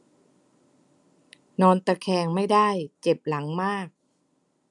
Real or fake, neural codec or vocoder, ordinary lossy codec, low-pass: real; none; none; 10.8 kHz